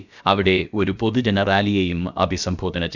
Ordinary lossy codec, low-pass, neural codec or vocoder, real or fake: none; 7.2 kHz; codec, 16 kHz, about 1 kbps, DyCAST, with the encoder's durations; fake